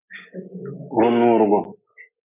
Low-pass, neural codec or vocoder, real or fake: 3.6 kHz; none; real